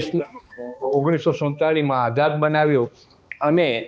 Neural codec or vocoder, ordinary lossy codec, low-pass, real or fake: codec, 16 kHz, 2 kbps, X-Codec, HuBERT features, trained on balanced general audio; none; none; fake